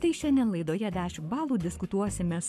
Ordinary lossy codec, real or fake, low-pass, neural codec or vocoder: MP3, 96 kbps; fake; 14.4 kHz; codec, 44.1 kHz, 7.8 kbps, Pupu-Codec